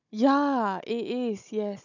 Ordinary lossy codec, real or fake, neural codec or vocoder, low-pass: none; fake; codec, 16 kHz, 16 kbps, FunCodec, trained on Chinese and English, 50 frames a second; 7.2 kHz